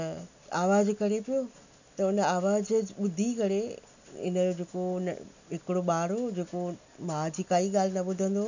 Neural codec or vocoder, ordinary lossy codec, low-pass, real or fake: none; none; 7.2 kHz; real